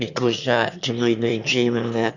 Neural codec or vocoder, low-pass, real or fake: autoencoder, 22.05 kHz, a latent of 192 numbers a frame, VITS, trained on one speaker; 7.2 kHz; fake